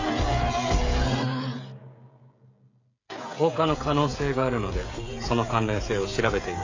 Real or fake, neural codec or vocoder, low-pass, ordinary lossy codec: fake; codec, 16 kHz, 16 kbps, FreqCodec, smaller model; 7.2 kHz; AAC, 32 kbps